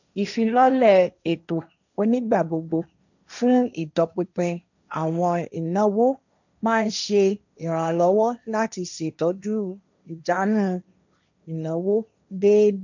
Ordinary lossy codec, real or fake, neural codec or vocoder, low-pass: none; fake; codec, 16 kHz, 1.1 kbps, Voila-Tokenizer; 7.2 kHz